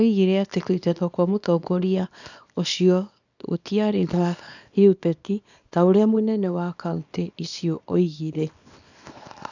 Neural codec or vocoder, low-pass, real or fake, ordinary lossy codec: codec, 24 kHz, 0.9 kbps, WavTokenizer, small release; 7.2 kHz; fake; none